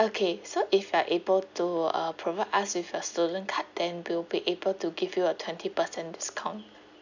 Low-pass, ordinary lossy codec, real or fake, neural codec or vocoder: 7.2 kHz; none; real; none